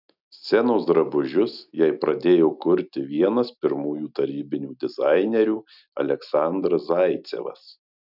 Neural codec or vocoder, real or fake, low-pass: none; real; 5.4 kHz